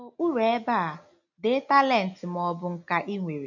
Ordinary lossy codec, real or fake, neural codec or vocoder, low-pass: none; real; none; 7.2 kHz